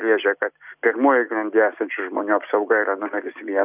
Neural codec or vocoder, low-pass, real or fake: none; 3.6 kHz; real